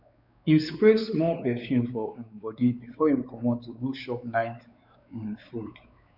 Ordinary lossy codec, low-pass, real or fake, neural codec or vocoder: none; 5.4 kHz; fake; codec, 16 kHz, 4 kbps, X-Codec, WavLM features, trained on Multilingual LibriSpeech